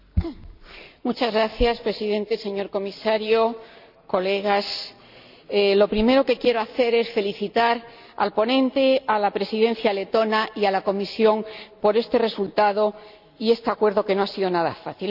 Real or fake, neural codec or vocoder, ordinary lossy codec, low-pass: real; none; none; 5.4 kHz